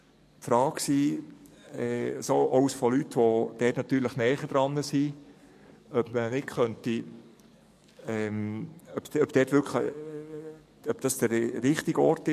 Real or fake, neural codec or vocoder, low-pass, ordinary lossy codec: fake; codec, 44.1 kHz, 7.8 kbps, DAC; 14.4 kHz; MP3, 64 kbps